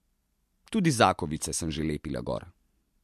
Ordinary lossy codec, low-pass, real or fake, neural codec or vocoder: MP3, 64 kbps; 14.4 kHz; real; none